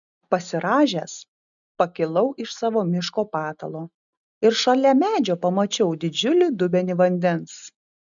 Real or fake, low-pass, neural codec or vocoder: real; 7.2 kHz; none